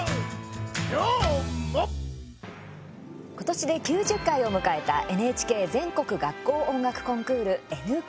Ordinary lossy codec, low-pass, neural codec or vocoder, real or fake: none; none; none; real